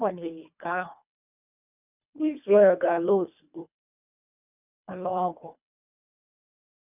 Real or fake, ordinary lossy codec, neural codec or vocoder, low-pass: fake; none; codec, 24 kHz, 1.5 kbps, HILCodec; 3.6 kHz